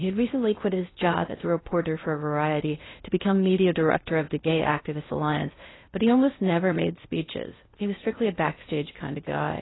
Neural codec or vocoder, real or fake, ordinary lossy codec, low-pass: codec, 16 kHz in and 24 kHz out, 0.6 kbps, FocalCodec, streaming, 4096 codes; fake; AAC, 16 kbps; 7.2 kHz